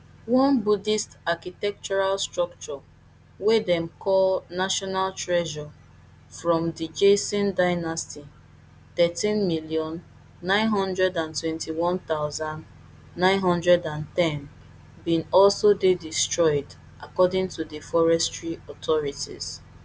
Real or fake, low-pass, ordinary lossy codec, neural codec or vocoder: real; none; none; none